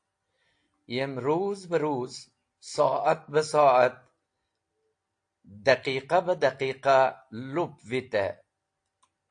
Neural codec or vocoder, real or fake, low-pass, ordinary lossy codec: none; real; 9.9 kHz; AAC, 48 kbps